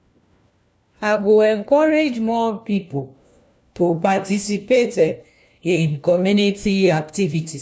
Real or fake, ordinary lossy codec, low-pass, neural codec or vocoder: fake; none; none; codec, 16 kHz, 1 kbps, FunCodec, trained on LibriTTS, 50 frames a second